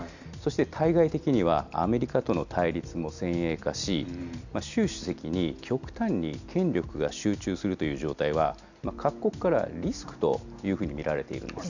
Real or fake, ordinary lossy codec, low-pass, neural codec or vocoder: real; none; 7.2 kHz; none